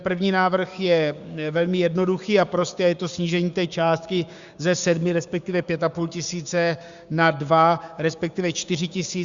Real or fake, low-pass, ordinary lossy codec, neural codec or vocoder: fake; 7.2 kHz; Opus, 64 kbps; codec, 16 kHz, 6 kbps, DAC